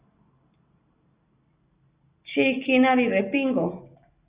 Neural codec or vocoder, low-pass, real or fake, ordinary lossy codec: none; 3.6 kHz; real; Opus, 64 kbps